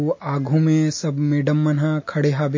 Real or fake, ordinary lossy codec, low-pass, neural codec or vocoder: real; MP3, 32 kbps; 7.2 kHz; none